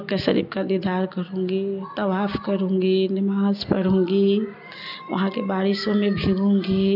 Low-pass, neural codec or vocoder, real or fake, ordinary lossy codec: 5.4 kHz; none; real; none